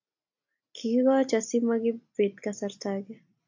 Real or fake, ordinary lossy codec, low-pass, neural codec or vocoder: real; AAC, 48 kbps; 7.2 kHz; none